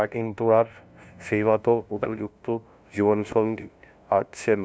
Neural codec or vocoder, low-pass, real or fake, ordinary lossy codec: codec, 16 kHz, 0.5 kbps, FunCodec, trained on LibriTTS, 25 frames a second; none; fake; none